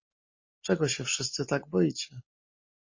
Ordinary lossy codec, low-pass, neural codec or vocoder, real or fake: MP3, 32 kbps; 7.2 kHz; none; real